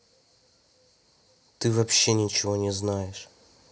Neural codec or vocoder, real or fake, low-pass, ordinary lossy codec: none; real; none; none